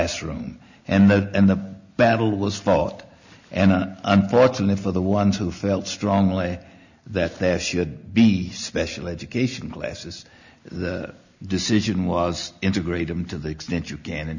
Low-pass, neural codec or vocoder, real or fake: 7.2 kHz; none; real